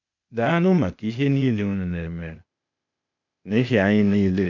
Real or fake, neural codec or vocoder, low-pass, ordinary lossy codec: fake; codec, 16 kHz, 0.8 kbps, ZipCodec; 7.2 kHz; none